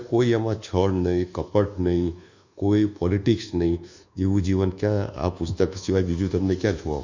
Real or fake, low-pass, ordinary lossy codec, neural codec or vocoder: fake; 7.2 kHz; Opus, 64 kbps; codec, 24 kHz, 1.2 kbps, DualCodec